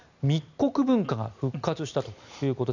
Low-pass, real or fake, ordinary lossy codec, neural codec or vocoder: 7.2 kHz; real; none; none